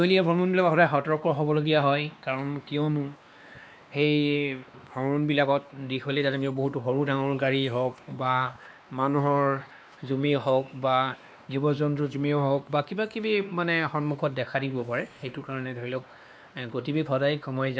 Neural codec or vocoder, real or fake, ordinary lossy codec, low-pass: codec, 16 kHz, 2 kbps, X-Codec, WavLM features, trained on Multilingual LibriSpeech; fake; none; none